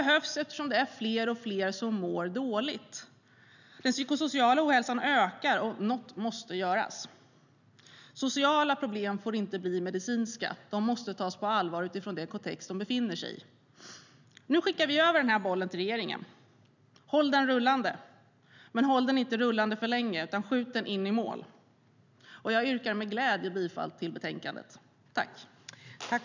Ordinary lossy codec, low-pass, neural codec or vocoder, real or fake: none; 7.2 kHz; none; real